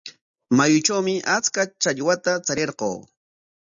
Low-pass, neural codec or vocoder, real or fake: 7.2 kHz; none; real